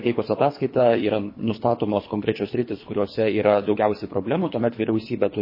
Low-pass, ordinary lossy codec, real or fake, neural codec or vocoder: 5.4 kHz; MP3, 24 kbps; fake; codec, 24 kHz, 3 kbps, HILCodec